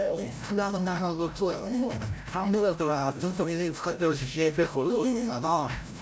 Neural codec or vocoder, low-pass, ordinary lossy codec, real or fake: codec, 16 kHz, 0.5 kbps, FreqCodec, larger model; none; none; fake